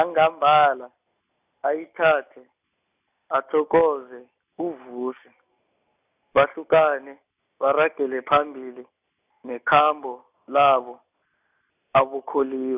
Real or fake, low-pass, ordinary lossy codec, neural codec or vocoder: real; 3.6 kHz; none; none